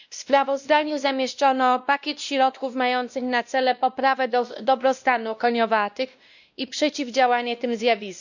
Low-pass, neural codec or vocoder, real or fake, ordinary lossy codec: 7.2 kHz; codec, 16 kHz, 1 kbps, X-Codec, WavLM features, trained on Multilingual LibriSpeech; fake; none